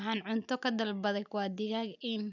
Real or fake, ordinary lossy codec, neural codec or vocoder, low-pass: real; none; none; 7.2 kHz